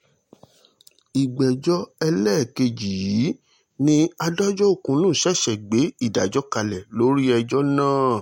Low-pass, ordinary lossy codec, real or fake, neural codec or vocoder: 19.8 kHz; MP3, 64 kbps; real; none